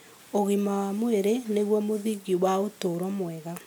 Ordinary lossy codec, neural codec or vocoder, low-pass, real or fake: none; none; none; real